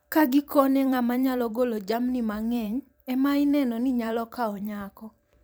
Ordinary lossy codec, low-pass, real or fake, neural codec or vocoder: none; none; fake; vocoder, 44.1 kHz, 128 mel bands every 512 samples, BigVGAN v2